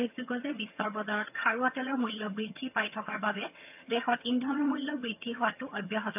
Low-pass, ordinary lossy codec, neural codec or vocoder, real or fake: 3.6 kHz; AAC, 32 kbps; vocoder, 22.05 kHz, 80 mel bands, HiFi-GAN; fake